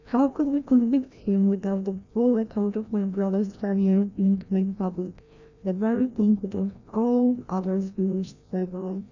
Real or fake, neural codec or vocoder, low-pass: fake; codec, 16 kHz, 1 kbps, FreqCodec, larger model; 7.2 kHz